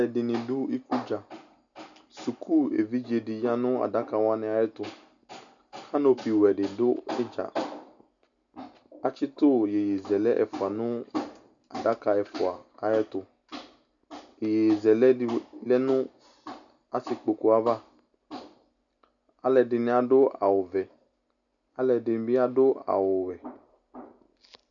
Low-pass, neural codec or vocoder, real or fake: 7.2 kHz; none; real